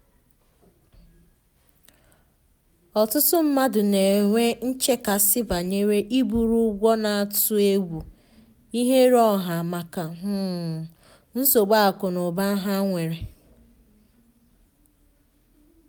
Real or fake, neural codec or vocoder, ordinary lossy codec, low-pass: real; none; none; none